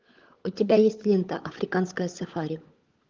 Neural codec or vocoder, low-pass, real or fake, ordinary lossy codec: codec, 16 kHz, 16 kbps, FunCodec, trained on LibriTTS, 50 frames a second; 7.2 kHz; fake; Opus, 32 kbps